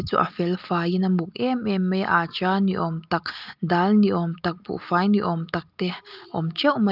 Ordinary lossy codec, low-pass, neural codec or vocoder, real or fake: Opus, 24 kbps; 5.4 kHz; none; real